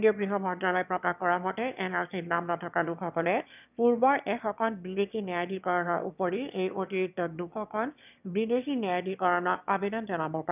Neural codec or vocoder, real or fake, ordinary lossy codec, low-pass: autoencoder, 22.05 kHz, a latent of 192 numbers a frame, VITS, trained on one speaker; fake; none; 3.6 kHz